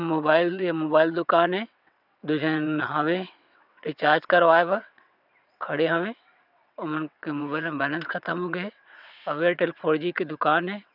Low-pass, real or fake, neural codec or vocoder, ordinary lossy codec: 5.4 kHz; fake; vocoder, 22.05 kHz, 80 mel bands, WaveNeXt; none